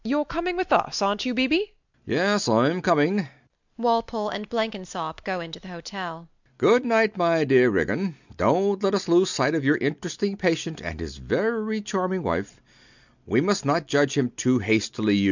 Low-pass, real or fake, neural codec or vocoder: 7.2 kHz; real; none